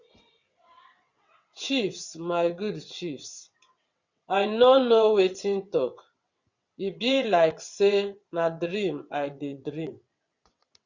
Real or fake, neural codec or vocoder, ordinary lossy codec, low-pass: fake; vocoder, 22.05 kHz, 80 mel bands, WaveNeXt; Opus, 64 kbps; 7.2 kHz